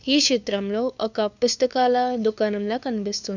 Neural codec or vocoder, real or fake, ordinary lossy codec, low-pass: codec, 16 kHz, 2 kbps, FunCodec, trained on LibriTTS, 25 frames a second; fake; none; 7.2 kHz